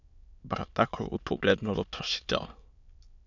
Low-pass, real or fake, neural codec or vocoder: 7.2 kHz; fake; autoencoder, 22.05 kHz, a latent of 192 numbers a frame, VITS, trained on many speakers